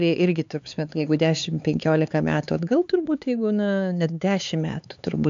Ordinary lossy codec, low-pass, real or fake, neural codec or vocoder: AAC, 64 kbps; 7.2 kHz; fake; codec, 16 kHz, 4 kbps, X-Codec, HuBERT features, trained on LibriSpeech